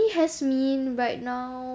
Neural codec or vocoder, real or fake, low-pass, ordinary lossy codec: none; real; none; none